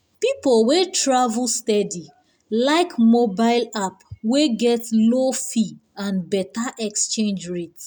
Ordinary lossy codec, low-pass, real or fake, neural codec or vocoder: none; none; real; none